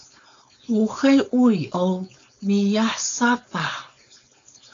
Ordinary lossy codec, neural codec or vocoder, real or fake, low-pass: AAC, 48 kbps; codec, 16 kHz, 4.8 kbps, FACodec; fake; 7.2 kHz